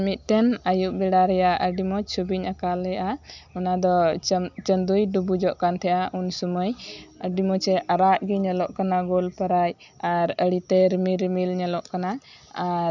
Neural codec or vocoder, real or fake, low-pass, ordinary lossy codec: none; real; 7.2 kHz; none